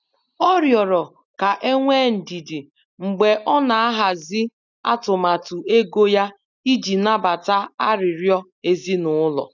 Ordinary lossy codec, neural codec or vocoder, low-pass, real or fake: none; none; 7.2 kHz; real